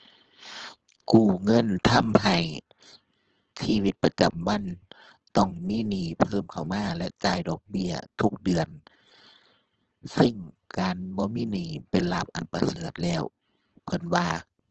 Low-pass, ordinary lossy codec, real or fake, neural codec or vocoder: 7.2 kHz; Opus, 16 kbps; fake; codec, 16 kHz, 4.8 kbps, FACodec